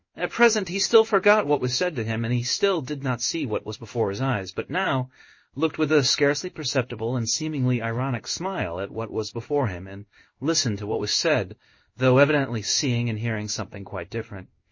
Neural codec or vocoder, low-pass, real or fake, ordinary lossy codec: codec, 16 kHz in and 24 kHz out, 1 kbps, XY-Tokenizer; 7.2 kHz; fake; MP3, 32 kbps